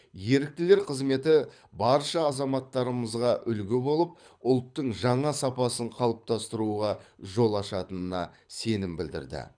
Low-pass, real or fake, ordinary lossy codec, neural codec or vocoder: 9.9 kHz; fake; none; codec, 24 kHz, 6 kbps, HILCodec